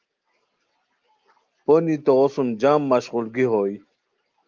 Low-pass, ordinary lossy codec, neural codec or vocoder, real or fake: 7.2 kHz; Opus, 32 kbps; none; real